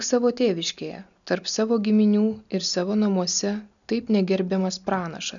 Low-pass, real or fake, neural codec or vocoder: 7.2 kHz; real; none